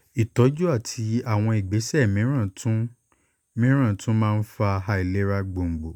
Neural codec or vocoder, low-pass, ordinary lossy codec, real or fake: none; 19.8 kHz; none; real